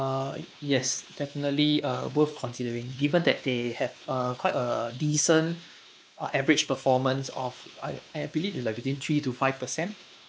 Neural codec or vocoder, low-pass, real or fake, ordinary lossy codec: codec, 16 kHz, 2 kbps, X-Codec, WavLM features, trained on Multilingual LibriSpeech; none; fake; none